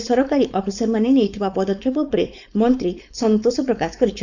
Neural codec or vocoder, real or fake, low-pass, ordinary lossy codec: codec, 16 kHz, 4.8 kbps, FACodec; fake; 7.2 kHz; none